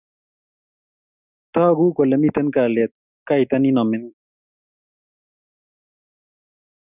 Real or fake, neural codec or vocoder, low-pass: real; none; 3.6 kHz